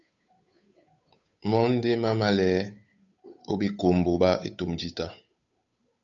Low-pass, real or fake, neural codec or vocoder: 7.2 kHz; fake; codec, 16 kHz, 8 kbps, FunCodec, trained on Chinese and English, 25 frames a second